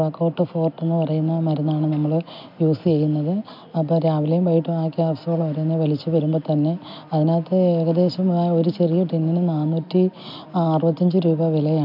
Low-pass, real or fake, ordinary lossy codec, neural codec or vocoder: 5.4 kHz; real; MP3, 48 kbps; none